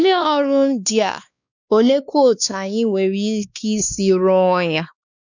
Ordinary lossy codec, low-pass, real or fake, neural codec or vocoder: none; 7.2 kHz; fake; codec, 16 kHz, 2 kbps, X-Codec, WavLM features, trained on Multilingual LibriSpeech